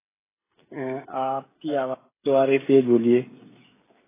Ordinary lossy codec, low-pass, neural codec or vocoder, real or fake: AAC, 16 kbps; 3.6 kHz; codec, 16 kHz, 16 kbps, FreqCodec, smaller model; fake